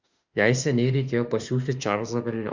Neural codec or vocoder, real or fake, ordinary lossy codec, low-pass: autoencoder, 48 kHz, 32 numbers a frame, DAC-VAE, trained on Japanese speech; fake; Opus, 64 kbps; 7.2 kHz